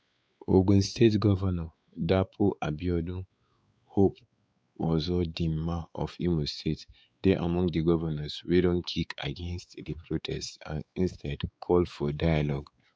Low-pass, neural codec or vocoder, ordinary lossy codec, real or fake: none; codec, 16 kHz, 4 kbps, X-Codec, WavLM features, trained on Multilingual LibriSpeech; none; fake